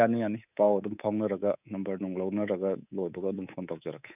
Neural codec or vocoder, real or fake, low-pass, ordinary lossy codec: codec, 24 kHz, 3.1 kbps, DualCodec; fake; 3.6 kHz; none